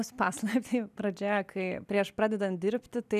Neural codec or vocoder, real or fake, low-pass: none; real; 14.4 kHz